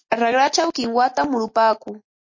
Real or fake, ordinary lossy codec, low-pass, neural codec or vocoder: real; MP3, 32 kbps; 7.2 kHz; none